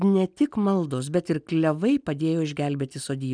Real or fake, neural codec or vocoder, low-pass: real; none; 9.9 kHz